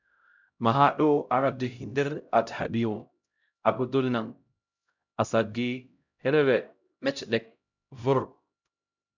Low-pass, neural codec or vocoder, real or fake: 7.2 kHz; codec, 16 kHz, 0.5 kbps, X-Codec, HuBERT features, trained on LibriSpeech; fake